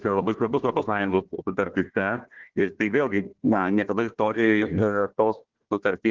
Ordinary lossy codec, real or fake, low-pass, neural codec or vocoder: Opus, 16 kbps; fake; 7.2 kHz; codec, 16 kHz, 1 kbps, FunCodec, trained on Chinese and English, 50 frames a second